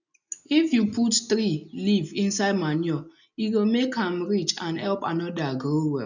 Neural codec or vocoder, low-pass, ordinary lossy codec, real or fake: none; 7.2 kHz; none; real